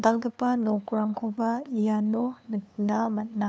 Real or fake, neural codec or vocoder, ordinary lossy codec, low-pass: fake; codec, 16 kHz, 2 kbps, FunCodec, trained on LibriTTS, 25 frames a second; none; none